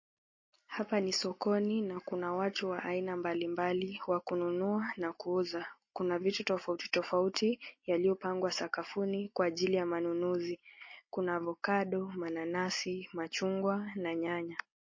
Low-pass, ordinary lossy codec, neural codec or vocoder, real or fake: 7.2 kHz; MP3, 32 kbps; none; real